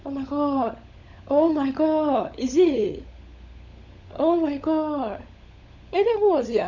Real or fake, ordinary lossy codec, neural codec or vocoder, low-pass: fake; none; codec, 16 kHz, 16 kbps, FunCodec, trained on LibriTTS, 50 frames a second; 7.2 kHz